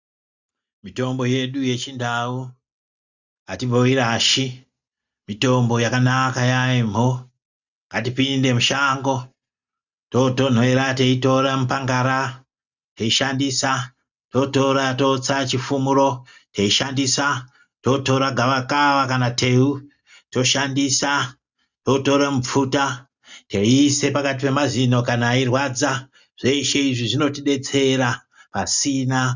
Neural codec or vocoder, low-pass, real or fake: none; 7.2 kHz; real